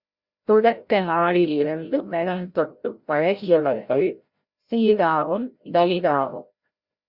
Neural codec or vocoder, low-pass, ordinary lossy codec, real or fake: codec, 16 kHz, 0.5 kbps, FreqCodec, larger model; 5.4 kHz; Opus, 64 kbps; fake